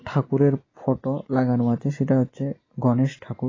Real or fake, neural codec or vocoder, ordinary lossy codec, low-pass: real; none; AAC, 32 kbps; 7.2 kHz